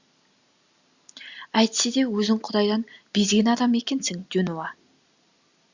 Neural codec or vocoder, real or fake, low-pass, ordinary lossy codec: none; real; 7.2 kHz; Opus, 64 kbps